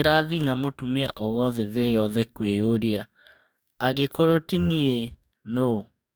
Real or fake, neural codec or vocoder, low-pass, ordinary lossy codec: fake; codec, 44.1 kHz, 2.6 kbps, DAC; none; none